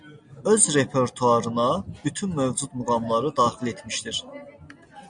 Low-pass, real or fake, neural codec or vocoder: 9.9 kHz; real; none